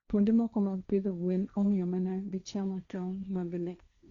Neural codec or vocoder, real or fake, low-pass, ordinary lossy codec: codec, 16 kHz, 1.1 kbps, Voila-Tokenizer; fake; 7.2 kHz; Opus, 64 kbps